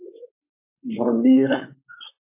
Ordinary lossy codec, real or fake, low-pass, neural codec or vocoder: MP3, 24 kbps; fake; 3.6 kHz; codec, 16 kHz, 4.8 kbps, FACodec